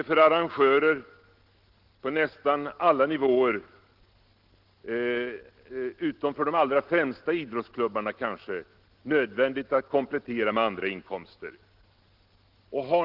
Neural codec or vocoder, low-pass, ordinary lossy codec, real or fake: none; 5.4 kHz; Opus, 16 kbps; real